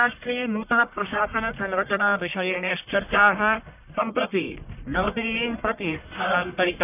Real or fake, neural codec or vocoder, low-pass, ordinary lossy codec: fake; codec, 44.1 kHz, 1.7 kbps, Pupu-Codec; 3.6 kHz; none